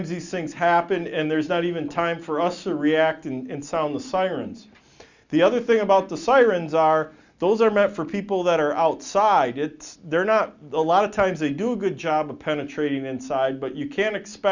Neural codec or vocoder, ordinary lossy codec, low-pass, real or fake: none; Opus, 64 kbps; 7.2 kHz; real